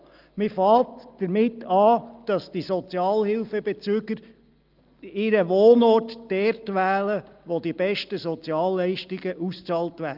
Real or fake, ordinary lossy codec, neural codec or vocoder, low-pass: real; Opus, 32 kbps; none; 5.4 kHz